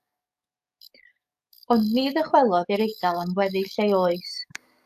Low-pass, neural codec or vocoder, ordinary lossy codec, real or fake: 14.4 kHz; codec, 44.1 kHz, 7.8 kbps, DAC; AAC, 96 kbps; fake